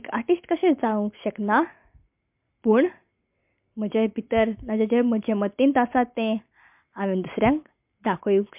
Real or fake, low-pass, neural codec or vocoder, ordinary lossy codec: real; 3.6 kHz; none; MP3, 32 kbps